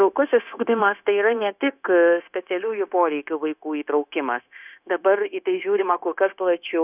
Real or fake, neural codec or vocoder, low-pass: fake; codec, 16 kHz, 0.9 kbps, LongCat-Audio-Codec; 3.6 kHz